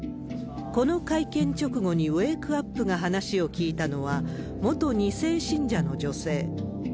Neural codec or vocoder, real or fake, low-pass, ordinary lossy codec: none; real; none; none